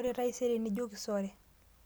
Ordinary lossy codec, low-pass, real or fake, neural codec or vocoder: none; none; real; none